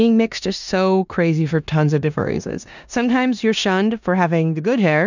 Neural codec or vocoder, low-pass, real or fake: codec, 16 kHz in and 24 kHz out, 0.9 kbps, LongCat-Audio-Codec, four codebook decoder; 7.2 kHz; fake